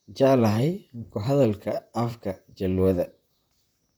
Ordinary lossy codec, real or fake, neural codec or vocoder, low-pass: none; fake; vocoder, 44.1 kHz, 128 mel bands, Pupu-Vocoder; none